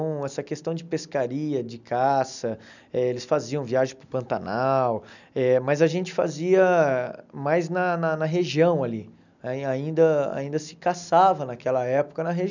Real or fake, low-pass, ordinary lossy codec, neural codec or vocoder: real; 7.2 kHz; none; none